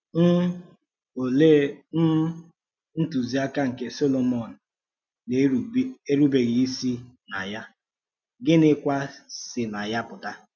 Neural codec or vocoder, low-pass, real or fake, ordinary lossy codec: none; 7.2 kHz; real; none